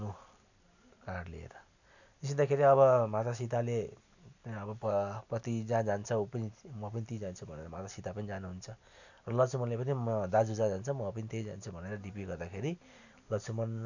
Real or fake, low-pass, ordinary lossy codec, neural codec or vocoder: real; 7.2 kHz; none; none